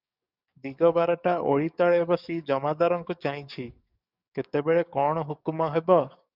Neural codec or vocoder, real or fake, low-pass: codec, 44.1 kHz, 7.8 kbps, DAC; fake; 5.4 kHz